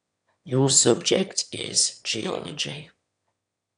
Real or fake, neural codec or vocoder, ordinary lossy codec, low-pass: fake; autoencoder, 22.05 kHz, a latent of 192 numbers a frame, VITS, trained on one speaker; none; 9.9 kHz